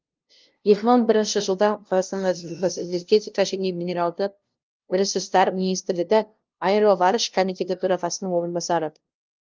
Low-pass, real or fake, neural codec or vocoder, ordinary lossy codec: 7.2 kHz; fake; codec, 16 kHz, 0.5 kbps, FunCodec, trained on LibriTTS, 25 frames a second; Opus, 32 kbps